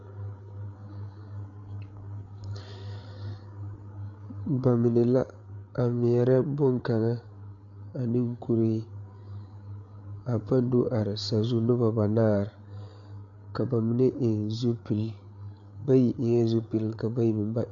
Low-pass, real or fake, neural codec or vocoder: 7.2 kHz; fake; codec, 16 kHz, 8 kbps, FreqCodec, larger model